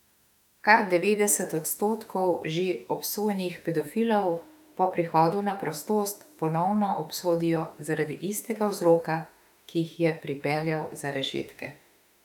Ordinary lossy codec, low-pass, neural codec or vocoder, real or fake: none; 19.8 kHz; autoencoder, 48 kHz, 32 numbers a frame, DAC-VAE, trained on Japanese speech; fake